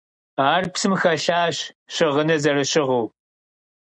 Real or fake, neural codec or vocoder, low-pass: real; none; 9.9 kHz